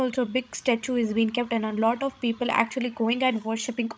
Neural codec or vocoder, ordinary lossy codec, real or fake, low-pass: codec, 16 kHz, 16 kbps, FreqCodec, larger model; none; fake; none